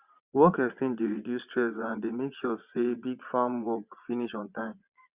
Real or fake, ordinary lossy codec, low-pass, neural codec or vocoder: fake; Opus, 64 kbps; 3.6 kHz; vocoder, 22.05 kHz, 80 mel bands, Vocos